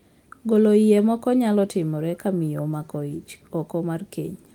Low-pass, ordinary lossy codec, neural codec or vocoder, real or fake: 19.8 kHz; Opus, 24 kbps; none; real